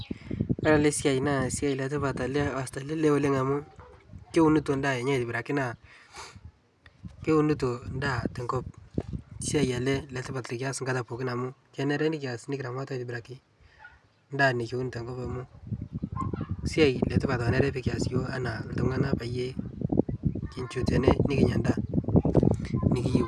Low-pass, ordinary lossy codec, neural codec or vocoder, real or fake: none; none; none; real